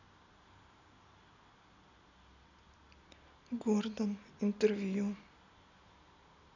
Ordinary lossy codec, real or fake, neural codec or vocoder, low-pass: none; real; none; 7.2 kHz